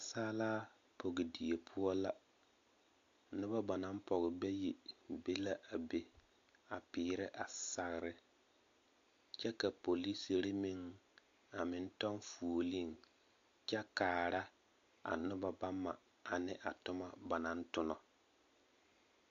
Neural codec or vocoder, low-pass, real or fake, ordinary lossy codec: none; 7.2 kHz; real; AAC, 48 kbps